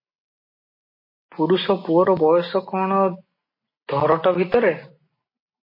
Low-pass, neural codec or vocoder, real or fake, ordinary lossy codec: 5.4 kHz; none; real; MP3, 24 kbps